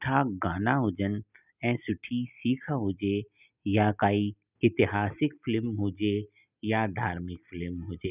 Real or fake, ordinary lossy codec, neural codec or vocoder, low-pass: real; none; none; 3.6 kHz